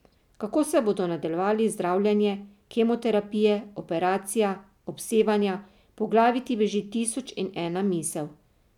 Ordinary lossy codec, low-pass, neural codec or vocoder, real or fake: none; 19.8 kHz; none; real